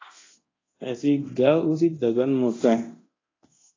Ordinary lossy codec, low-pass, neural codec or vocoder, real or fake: AAC, 32 kbps; 7.2 kHz; codec, 24 kHz, 0.9 kbps, DualCodec; fake